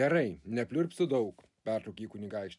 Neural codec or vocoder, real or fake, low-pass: none; real; 10.8 kHz